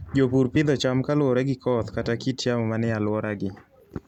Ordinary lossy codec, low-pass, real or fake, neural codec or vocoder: none; 19.8 kHz; real; none